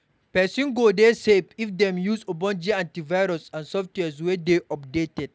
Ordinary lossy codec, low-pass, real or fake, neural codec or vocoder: none; none; real; none